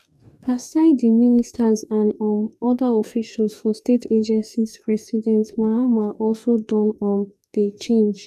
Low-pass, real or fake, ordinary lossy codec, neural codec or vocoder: 14.4 kHz; fake; none; codec, 44.1 kHz, 2.6 kbps, DAC